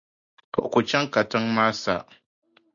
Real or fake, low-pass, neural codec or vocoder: real; 7.2 kHz; none